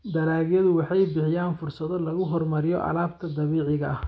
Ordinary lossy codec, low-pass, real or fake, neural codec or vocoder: none; none; real; none